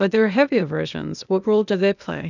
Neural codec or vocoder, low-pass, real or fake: codec, 16 kHz, 0.8 kbps, ZipCodec; 7.2 kHz; fake